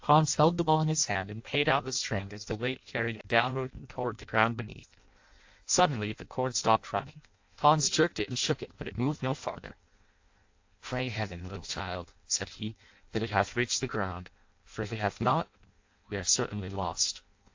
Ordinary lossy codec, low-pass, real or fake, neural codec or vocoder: AAC, 48 kbps; 7.2 kHz; fake; codec, 16 kHz in and 24 kHz out, 0.6 kbps, FireRedTTS-2 codec